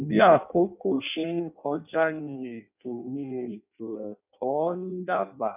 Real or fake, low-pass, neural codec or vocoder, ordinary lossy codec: fake; 3.6 kHz; codec, 16 kHz in and 24 kHz out, 0.6 kbps, FireRedTTS-2 codec; none